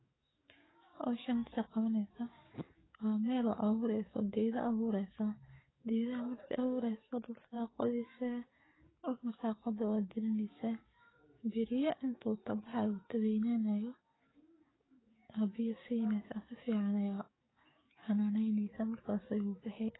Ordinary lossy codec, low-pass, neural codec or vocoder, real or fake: AAC, 16 kbps; 7.2 kHz; codec, 44.1 kHz, 7.8 kbps, DAC; fake